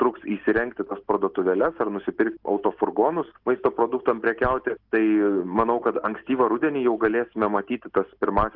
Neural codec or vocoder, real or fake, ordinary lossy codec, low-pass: none; real; Opus, 16 kbps; 5.4 kHz